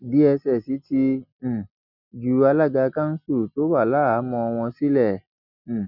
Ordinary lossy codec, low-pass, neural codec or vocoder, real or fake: none; 5.4 kHz; none; real